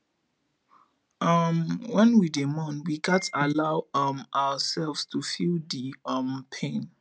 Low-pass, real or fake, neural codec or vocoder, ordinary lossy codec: none; real; none; none